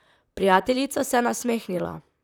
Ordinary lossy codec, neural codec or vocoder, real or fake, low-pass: none; vocoder, 44.1 kHz, 128 mel bands, Pupu-Vocoder; fake; none